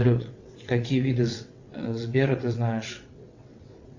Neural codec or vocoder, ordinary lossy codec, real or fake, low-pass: vocoder, 22.05 kHz, 80 mel bands, WaveNeXt; Opus, 64 kbps; fake; 7.2 kHz